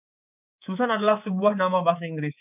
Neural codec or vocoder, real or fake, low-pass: codec, 24 kHz, 3.1 kbps, DualCodec; fake; 3.6 kHz